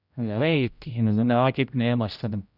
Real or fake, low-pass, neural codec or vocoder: fake; 5.4 kHz; codec, 16 kHz, 0.5 kbps, X-Codec, HuBERT features, trained on general audio